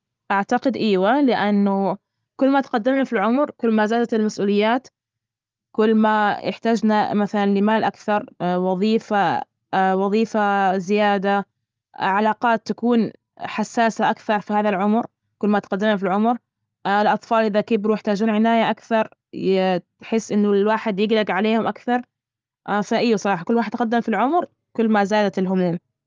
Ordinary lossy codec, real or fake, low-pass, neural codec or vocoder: Opus, 24 kbps; real; 7.2 kHz; none